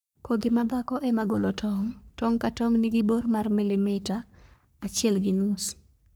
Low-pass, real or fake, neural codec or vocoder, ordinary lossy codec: none; fake; codec, 44.1 kHz, 3.4 kbps, Pupu-Codec; none